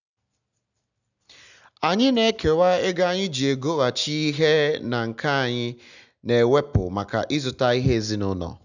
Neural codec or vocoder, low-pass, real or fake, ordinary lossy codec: none; 7.2 kHz; real; none